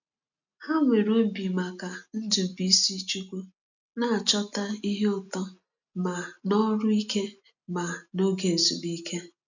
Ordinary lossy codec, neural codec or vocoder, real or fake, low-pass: none; none; real; 7.2 kHz